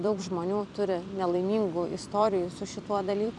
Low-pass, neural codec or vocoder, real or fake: 10.8 kHz; vocoder, 44.1 kHz, 128 mel bands every 256 samples, BigVGAN v2; fake